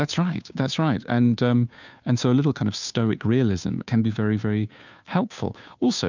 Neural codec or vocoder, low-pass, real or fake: codec, 16 kHz, 2 kbps, FunCodec, trained on Chinese and English, 25 frames a second; 7.2 kHz; fake